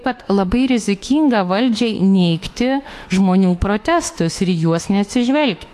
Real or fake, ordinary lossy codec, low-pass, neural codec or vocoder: fake; AAC, 64 kbps; 14.4 kHz; autoencoder, 48 kHz, 32 numbers a frame, DAC-VAE, trained on Japanese speech